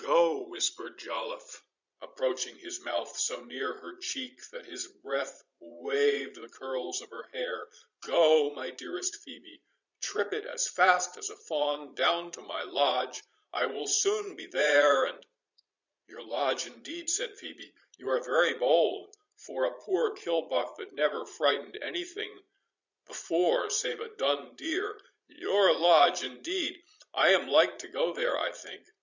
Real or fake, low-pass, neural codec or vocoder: fake; 7.2 kHz; vocoder, 22.05 kHz, 80 mel bands, Vocos